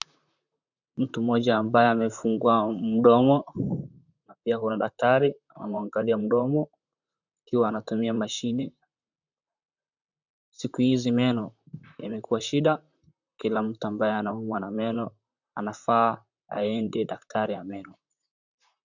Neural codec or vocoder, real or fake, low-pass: vocoder, 44.1 kHz, 128 mel bands, Pupu-Vocoder; fake; 7.2 kHz